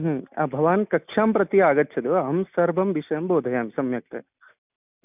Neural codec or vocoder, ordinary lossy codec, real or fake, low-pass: none; none; real; 3.6 kHz